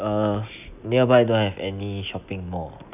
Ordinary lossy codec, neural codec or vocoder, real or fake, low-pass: none; none; real; 3.6 kHz